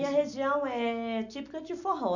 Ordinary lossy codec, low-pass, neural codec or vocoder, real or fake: none; 7.2 kHz; none; real